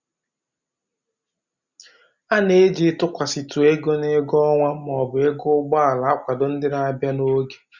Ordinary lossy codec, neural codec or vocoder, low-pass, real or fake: Opus, 64 kbps; none; 7.2 kHz; real